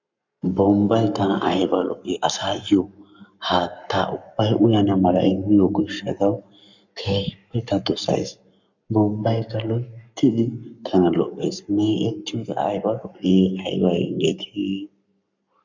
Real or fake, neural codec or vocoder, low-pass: fake; codec, 44.1 kHz, 7.8 kbps, Pupu-Codec; 7.2 kHz